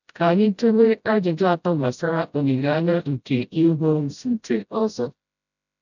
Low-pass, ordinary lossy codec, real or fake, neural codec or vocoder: 7.2 kHz; none; fake; codec, 16 kHz, 0.5 kbps, FreqCodec, smaller model